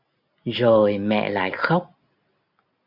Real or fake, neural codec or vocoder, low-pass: real; none; 5.4 kHz